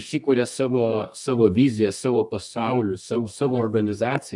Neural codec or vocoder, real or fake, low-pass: codec, 24 kHz, 0.9 kbps, WavTokenizer, medium music audio release; fake; 10.8 kHz